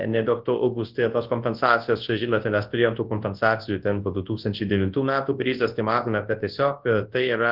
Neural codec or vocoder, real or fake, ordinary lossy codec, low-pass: codec, 24 kHz, 0.9 kbps, WavTokenizer, large speech release; fake; Opus, 16 kbps; 5.4 kHz